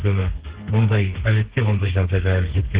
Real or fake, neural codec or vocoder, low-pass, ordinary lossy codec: fake; codec, 32 kHz, 1.9 kbps, SNAC; 3.6 kHz; Opus, 16 kbps